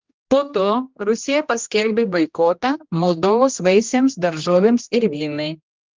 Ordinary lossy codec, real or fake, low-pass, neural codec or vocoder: Opus, 24 kbps; fake; 7.2 kHz; codec, 16 kHz, 1 kbps, X-Codec, HuBERT features, trained on general audio